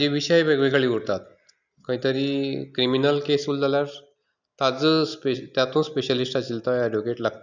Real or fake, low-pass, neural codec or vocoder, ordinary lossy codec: real; 7.2 kHz; none; none